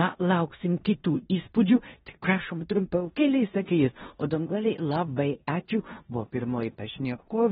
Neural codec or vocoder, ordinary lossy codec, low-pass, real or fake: codec, 16 kHz in and 24 kHz out, 0.9 kbps, LongCat-Audio-Codec, four codebook decoder; AAC, 16 kbps; 10.8 kHz; fake